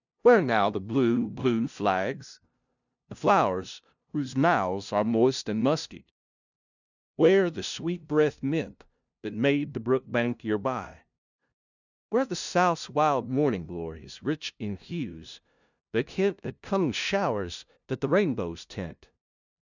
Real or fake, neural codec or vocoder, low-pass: fake; codec, 16 kHz, 0.5 kbps, FunCodec, trained on LibriTTS, 25 frames a second; 7.2 kHz